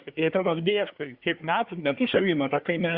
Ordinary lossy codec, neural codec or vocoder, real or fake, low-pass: Opus, 64 kbps; codec, 24 kHz, 1 kbps, SNAC; fake; 5.4 kHz